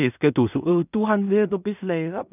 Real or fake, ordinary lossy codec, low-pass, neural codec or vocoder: fake; none; 3.6 kHz; codec, 16 kHz in and 24 kHz out, 0.4 kbps, LongCat-Audio-Codec, two codebook decoder